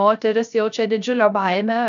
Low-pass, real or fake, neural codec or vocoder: 7.2 kHz; fake; codec, 16 kHz, 0.3 kbps, FocalCodec